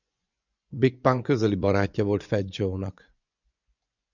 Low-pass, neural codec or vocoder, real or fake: 7.2 kHz; vocoder, 24 kHz, 100 mel bands, Vocos; fake